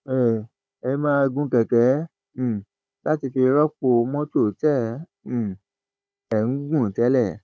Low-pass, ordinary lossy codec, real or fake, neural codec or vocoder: none; none; fake; codec, 16 kHz, 16 kbps, FunCodec, trained on Chinese and English, 50 frames a second